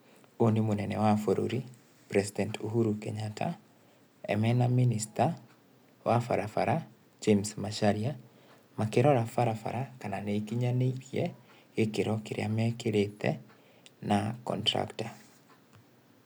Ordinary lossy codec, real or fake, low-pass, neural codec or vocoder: none; real; none; none